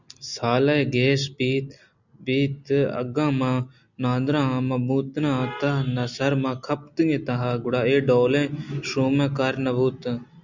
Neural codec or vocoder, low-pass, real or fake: none; 7.2 kHz; real